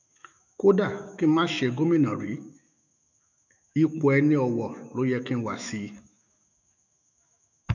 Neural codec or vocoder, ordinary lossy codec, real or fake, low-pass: autoencoder, 48 kHz, 128 numbers a frame, DAC-VAE, trained on Japanese speech; none; fake; 7.2 kHz